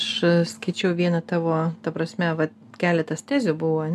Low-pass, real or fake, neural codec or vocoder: 14.4 kHz; real; none